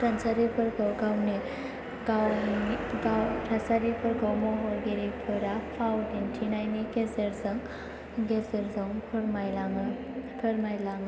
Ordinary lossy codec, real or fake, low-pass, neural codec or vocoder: none; real; none; none